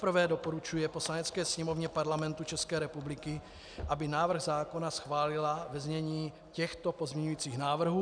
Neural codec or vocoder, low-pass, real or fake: none; 9.9 kHz; real